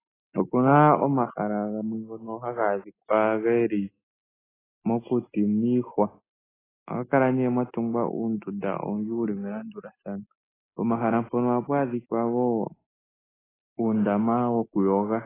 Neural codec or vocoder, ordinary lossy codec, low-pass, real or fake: none; AAC, 16 kbps; 3.6 kHz; real